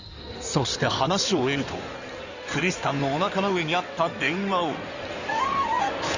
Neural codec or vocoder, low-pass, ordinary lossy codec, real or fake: codec, 16 kHz in and 24 kHz out, 2.2 kbps, FireRedTTS-2 codec; 7.2 kHz; Opus, 64 kbps; fake